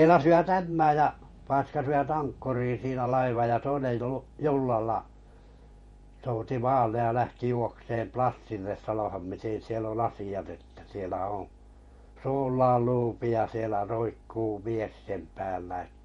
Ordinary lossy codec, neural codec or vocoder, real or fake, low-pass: MP3, 48 kbps; vocoder, 48 kHz, 128 mel bands, Vocos; fake; 19.8 kHz